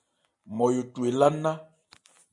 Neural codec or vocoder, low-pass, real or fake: none; 10.8 kHz; real